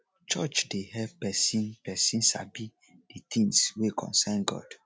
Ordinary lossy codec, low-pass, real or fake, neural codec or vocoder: none; none; real; none